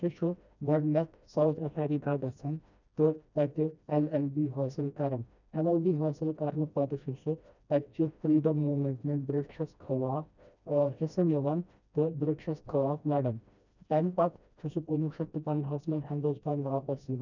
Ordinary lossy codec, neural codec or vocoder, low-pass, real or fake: none; codec, 16 kHz, 1 kbps, FreqCodec, smaller model; 7.2 kHz; fake